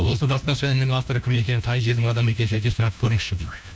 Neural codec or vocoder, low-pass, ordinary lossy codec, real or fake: codec, 16 kHz, 1 kbps, FunCodec, trained on LibriTTS, 50 frames a second; none; none; fake